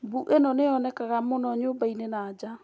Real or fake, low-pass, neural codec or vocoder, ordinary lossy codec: real; none; none; none